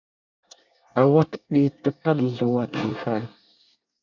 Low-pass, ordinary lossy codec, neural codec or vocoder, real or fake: 7.2 kHz; MP3, 64 kbps; codec, 24 kHz, 1 kbps, SNAC; fake